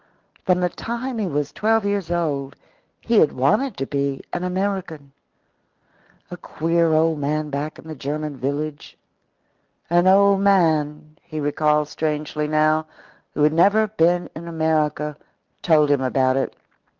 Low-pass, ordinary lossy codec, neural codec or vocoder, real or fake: 7.2 kHz; Opus, 24 kbps; none; real